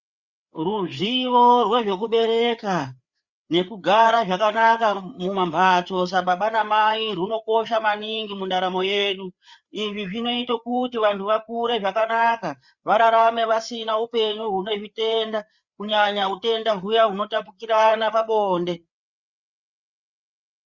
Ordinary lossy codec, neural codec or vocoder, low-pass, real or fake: Opus, 64 kbps; codec, 16 kHz, 4 kbps, FreqCodec, larger model; 7.2 kHz; fake